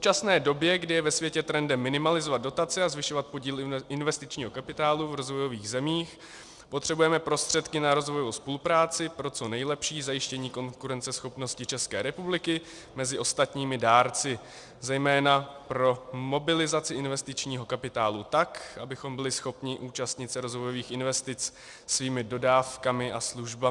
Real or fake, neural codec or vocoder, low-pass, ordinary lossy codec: real; none; 10.8 kHz; Opus, 64 kbps